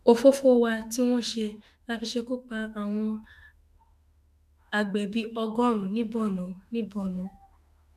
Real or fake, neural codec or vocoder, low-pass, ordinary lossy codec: fake; autoencoder, 48 kHz, 32 numbers a frame, DAC-VAE, trained on Japanese speech; 14.4 kHz; none